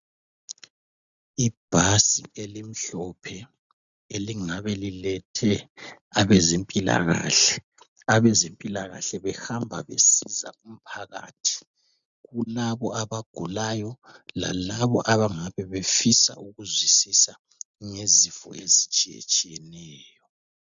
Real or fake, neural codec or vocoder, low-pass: real; none; 7.2 kHz